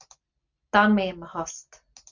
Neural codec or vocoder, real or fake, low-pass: none; real; 7.2 kHz